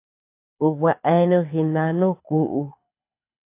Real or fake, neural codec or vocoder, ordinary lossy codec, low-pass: fake; codec, 24 kHz, 6 kbps, HILCodec; AAC, 24 kbps; 3.6 kHz